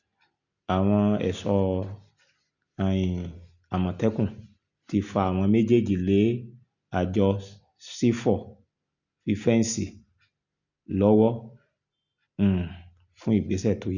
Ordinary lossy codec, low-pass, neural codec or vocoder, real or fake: none; 7.2 kHz; none; real